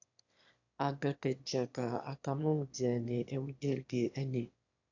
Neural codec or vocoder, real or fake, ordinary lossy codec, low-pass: autoencoder, 22.05 kHz, a latent of 192 numbers a frame, VITS, trained on one speaker; fake; AAC, 48 kbps; 7.2 kHz